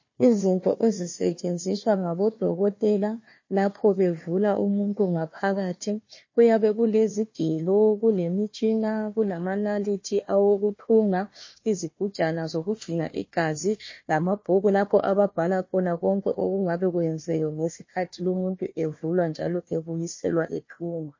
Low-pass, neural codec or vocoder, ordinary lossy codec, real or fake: 7.2 kHz; codec, 16 kHz, 1 kbps, FunCodec, trained on Chinese and English, 50 frames a second; MP3, 32 kbps; fake